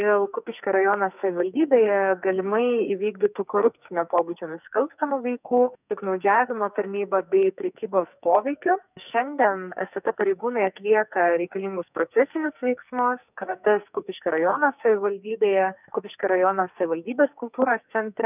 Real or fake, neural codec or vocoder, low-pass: fake; codec, 44.1 kHz, 2.6 kbps, SNAC; 3.6 kHz